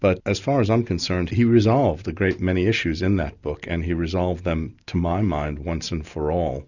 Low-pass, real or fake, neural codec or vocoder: 7.2 kHz; real; none